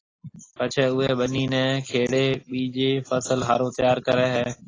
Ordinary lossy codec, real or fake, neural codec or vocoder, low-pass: AAC, 32 kbps; real; none; 7.2 kHz